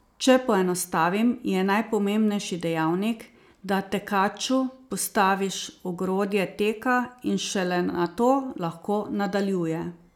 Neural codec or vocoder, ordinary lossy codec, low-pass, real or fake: none; none; 19.8 kHz; real